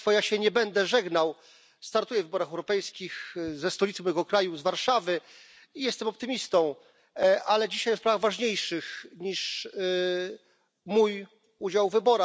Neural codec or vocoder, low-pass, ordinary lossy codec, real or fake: none; none; none; real